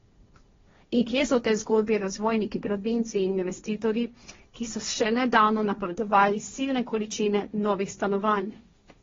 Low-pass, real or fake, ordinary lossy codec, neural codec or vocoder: 7.2 kHz; fake; AAC, 24 kbps; codec, 16 kHz, 1.1 kbps, Voila-Tokenizer